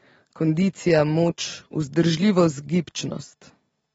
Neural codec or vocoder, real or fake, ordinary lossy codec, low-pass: none; real; AAC, 24 kbps; 19.8 kHz